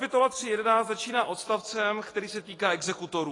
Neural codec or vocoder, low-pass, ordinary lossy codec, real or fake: none; 10.8 kHz; AAC, 32 kbps; real